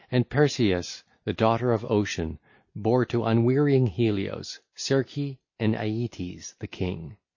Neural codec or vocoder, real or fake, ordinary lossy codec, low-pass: none; real; MP3, 32 kbps; 7.2 kHz